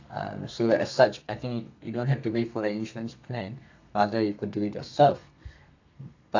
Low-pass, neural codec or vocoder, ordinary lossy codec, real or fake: 7.2 kHz; codec, 32 kHz, 1.9 kbps, SNAC; AAC, 48 kbps; fake